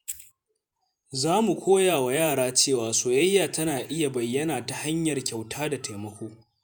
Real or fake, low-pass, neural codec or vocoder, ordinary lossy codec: fake; none; vocoder, 48 kHz, 128 mel bands, Vocos; none